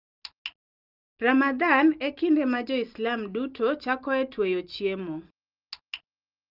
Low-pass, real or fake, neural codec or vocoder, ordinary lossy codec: 5.4 kHz; real; none; Opus, 32 kbps